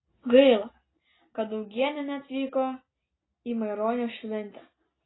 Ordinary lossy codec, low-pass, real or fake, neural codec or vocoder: AAC, 16 kbps; 7.2 kHz; real; none